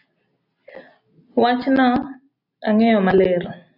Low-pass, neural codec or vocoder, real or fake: 5.4 kHz; none; real